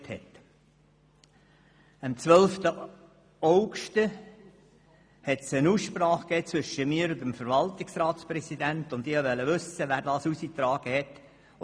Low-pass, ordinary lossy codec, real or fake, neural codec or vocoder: none; none; real; none